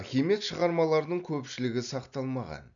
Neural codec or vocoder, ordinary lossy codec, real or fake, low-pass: none; none; real; 7.2 kHz